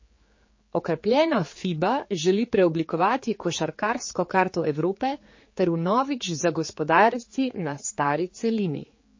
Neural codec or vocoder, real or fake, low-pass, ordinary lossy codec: codec, 16 kHz, 4 kbps, X-Codec, HuBERT features, trained on general audio; fake; 7.2 kHz; MP3, 32 kbps